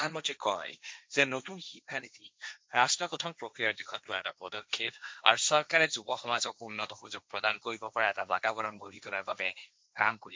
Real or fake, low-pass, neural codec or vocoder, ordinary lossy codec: fake; none; codec, 16 kHz, 1.1 kbps, Voila-Tokenizer; none